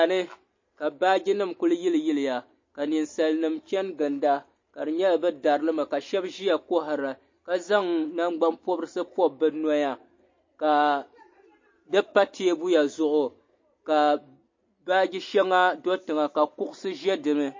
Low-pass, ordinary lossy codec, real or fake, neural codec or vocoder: 7.2 kHz; MP3, 32 kbps; real; none